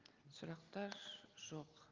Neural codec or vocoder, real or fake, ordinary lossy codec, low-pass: none; real; Opus, 24 kbps; 7.2 kHz